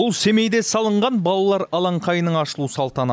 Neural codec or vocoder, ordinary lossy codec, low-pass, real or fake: none; none; none; real